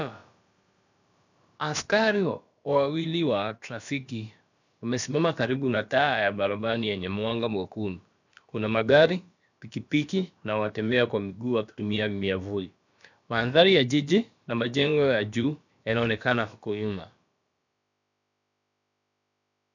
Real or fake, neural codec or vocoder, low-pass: fake; codec, 16 kHz, about 1 kbps, DyCAST, with the encoder's durations; 7.2 kHz